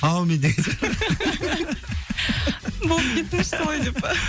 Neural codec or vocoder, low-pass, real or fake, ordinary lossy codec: none; none; real; none